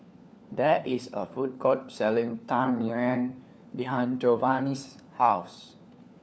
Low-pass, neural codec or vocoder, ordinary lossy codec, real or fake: none; codec, 16 kHz, 4 kbps, FunCodec, trained on LibriTTS, 50 frames a second; none; fake